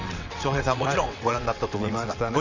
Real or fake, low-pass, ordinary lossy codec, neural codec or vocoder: fake; 7.2 kHz; none; vocoder, 22.05 kHz, 80 mel bands, WaveNeXt